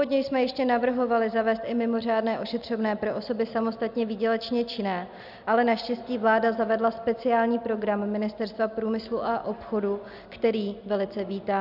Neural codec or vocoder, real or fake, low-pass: none; real; 5.4 kHz